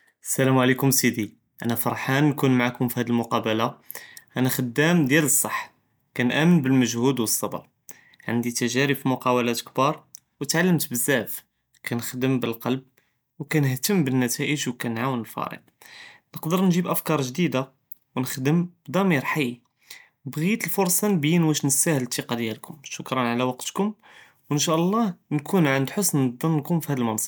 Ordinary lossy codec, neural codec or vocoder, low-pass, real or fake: none; none; none; real